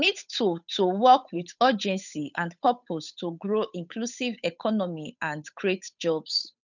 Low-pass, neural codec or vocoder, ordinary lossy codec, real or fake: 7.2 kHz; codec, 16 kHz, 8 kbps, FunCodec, trained on Chinese and English, 25 frames a second; none; fake